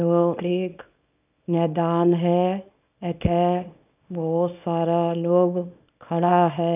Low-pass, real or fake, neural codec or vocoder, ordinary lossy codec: 3.6 kHz; fake; codec, 24 kHz, 0.9 kbps, WavTokenizer, small release; none